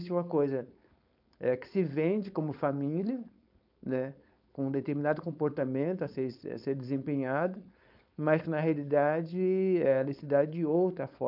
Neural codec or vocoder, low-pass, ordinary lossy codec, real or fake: codec, 16 kHz, 4.8 kbps, FACodec; 5.4 kHz; none; fake